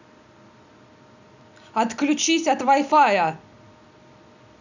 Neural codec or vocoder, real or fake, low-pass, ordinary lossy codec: none; real; 7.2 kHz; none